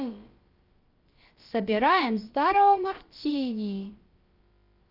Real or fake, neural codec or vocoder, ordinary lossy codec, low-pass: fake; codec, 16 kHz, about 1 kbps, DyCAST, with the encoder's durations; Opus, 24 kbps; 5.4 kHz